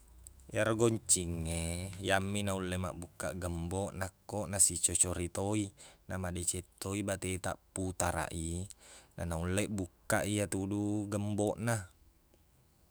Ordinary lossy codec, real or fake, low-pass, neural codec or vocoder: none; fake; none; autoencoder, 48 kHz, 128 numbers a frame, DAC-VAE, trained on Japanese speech